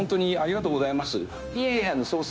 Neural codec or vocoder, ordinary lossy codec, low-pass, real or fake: codec, 16 kHz, 0.9 kbps, LongCat-Audio-Codec; none; none; fake